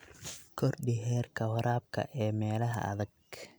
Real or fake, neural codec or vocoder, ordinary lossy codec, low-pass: real; none; none; none